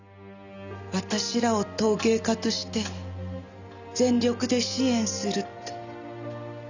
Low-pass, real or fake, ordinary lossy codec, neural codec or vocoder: 7.2 kHz; real; none; none